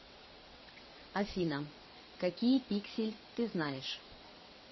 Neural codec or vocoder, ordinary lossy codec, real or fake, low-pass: vocoder, 22.05 kHz, 80 mel bands, WaveNeXt; MP3, 24 kbps; fake; 7.2 kHz